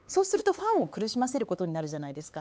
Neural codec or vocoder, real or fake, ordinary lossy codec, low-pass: codec, 16 kHz, 4 kbps, X-Codec, HuBERT features, trained on LibriSpeech; fake; none; none